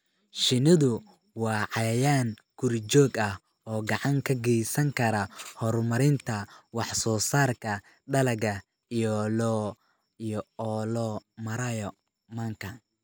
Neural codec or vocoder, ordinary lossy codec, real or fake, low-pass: none; none; real; none